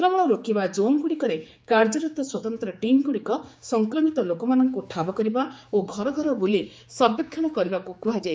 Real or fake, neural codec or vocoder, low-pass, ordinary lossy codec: fake; codec, 16 kHz, 4 kbps, X-Codec, HuBERT features, trained on general audio; none; none